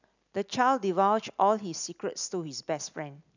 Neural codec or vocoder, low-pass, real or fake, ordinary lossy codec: none; 7.2 kHz; real; none